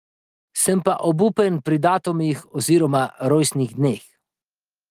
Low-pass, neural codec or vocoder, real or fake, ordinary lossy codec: 14.4 kHz; none; real; Opus, 24 kbps